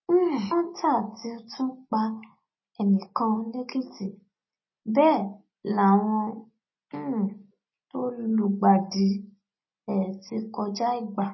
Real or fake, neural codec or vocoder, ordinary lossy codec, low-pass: real; none; MP3, 24 kbps; 7.2 kHz